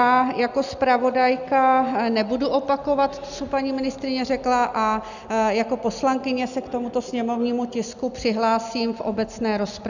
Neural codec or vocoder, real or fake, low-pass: none; real; 7.2 kHz